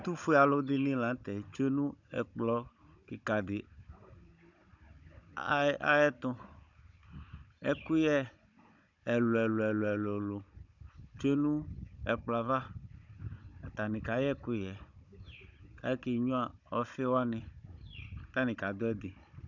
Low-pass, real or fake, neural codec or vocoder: 7.2 kHz; fake; codec, 16 kHz, 16 kbps, FunCodec, trained on Chinese and English, 50 frames a second